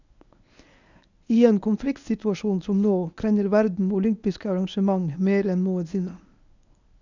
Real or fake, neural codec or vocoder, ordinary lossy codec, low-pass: fake; codec, 24 kHz, 0.9 kbps, WavTokenizer, medium speech release version 1; none; 7.2 kHz